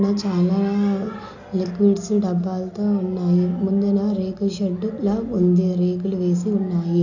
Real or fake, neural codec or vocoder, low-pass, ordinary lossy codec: real; none; 7.2 kHz; none